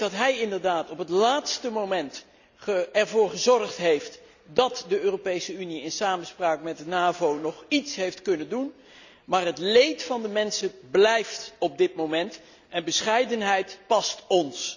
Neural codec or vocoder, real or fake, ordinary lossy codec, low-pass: none; real; none; 7.2 kHz